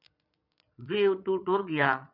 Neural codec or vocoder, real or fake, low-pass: vocoder, 22.05 kHz, 80 mel bands, Vocos; fake; 5.4 kHz